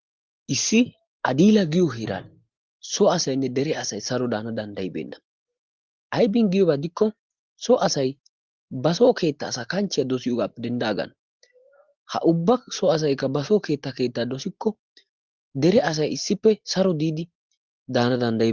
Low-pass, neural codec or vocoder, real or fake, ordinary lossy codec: 7.2 kHz; none; real; Opus, 16 kbps